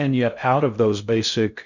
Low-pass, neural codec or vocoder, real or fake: 7.2 kHz; codec, 16 kHz in and 24 kHz out, 0.6 kbps, FocalCodec, streaming, 2048 codes; fake